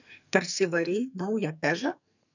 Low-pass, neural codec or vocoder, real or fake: 7.2 kHz; codec, 44.1 kHz, 2.6 kbps, SNAC; fake